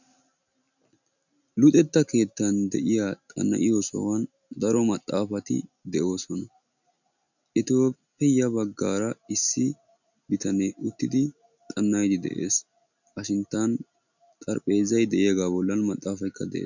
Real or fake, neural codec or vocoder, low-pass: real; none; 7.2 kHz